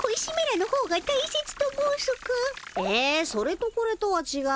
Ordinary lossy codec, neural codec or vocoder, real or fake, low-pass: none; none; real; none